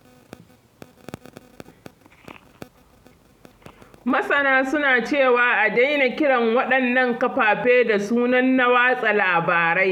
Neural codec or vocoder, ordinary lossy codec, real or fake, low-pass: none; none; real; 19.8 kHz